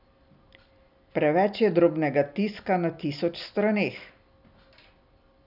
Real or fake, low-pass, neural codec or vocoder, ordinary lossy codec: real; 5.4 kHz; none; none